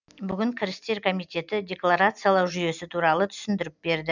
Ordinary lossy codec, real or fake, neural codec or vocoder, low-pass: none; real; none; 7.2 kHz